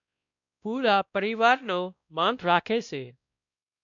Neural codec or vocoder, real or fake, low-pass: codec, 16 kHz, 1 kbps, X-Codec, WavLM features, trained on Multilingual LibriSpeech; fake; 7.2 kHz